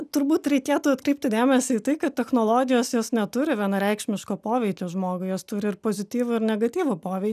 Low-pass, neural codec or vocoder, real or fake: 14.4 kHz; none; real